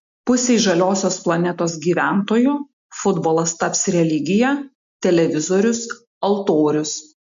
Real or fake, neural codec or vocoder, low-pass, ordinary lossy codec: real; none; 7.2 kHz; MP3, 48 kbps